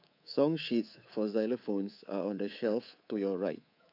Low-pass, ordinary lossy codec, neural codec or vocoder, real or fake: 5.4 kHz; AAC, 32 kbps; codec, 24 kHz, 3.1 kbps, DualCodec; fake